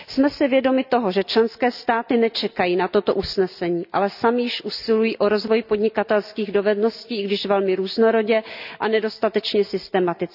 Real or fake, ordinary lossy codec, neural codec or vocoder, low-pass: real; none; none; 5.4 kHz